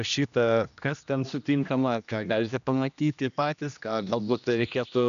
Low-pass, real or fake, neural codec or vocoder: 7.2 kHz; fake; codec, 16 kHz, 1 kbps, X-Codec, HuBERT features, trained on general audio